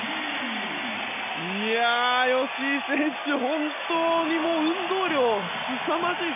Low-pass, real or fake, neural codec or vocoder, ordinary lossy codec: 3.6 kHz; real; none; none